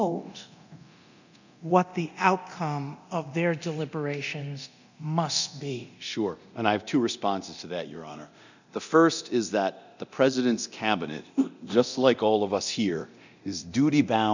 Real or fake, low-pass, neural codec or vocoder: fake; 7.2 kHz; codec, 24 kHz, 0.9 kbps, DualCodec